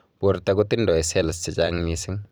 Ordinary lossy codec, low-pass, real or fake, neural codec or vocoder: none; none; fake; vocoder, 44.1 kHz, 128 mel bands every 256 samples, BigVGAN v2